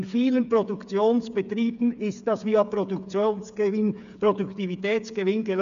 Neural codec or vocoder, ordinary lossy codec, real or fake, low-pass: codec, 16 kHz, 8 kbps, FreqCodec, smaller model; none; fake; 7.2 kHz